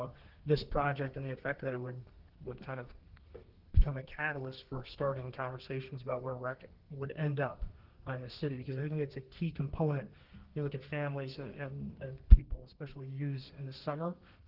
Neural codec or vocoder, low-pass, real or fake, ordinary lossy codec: codec, 32 kHz, 1.9 kbps, SNAC; 5.4 kHz; fake; Opus, 16 kbps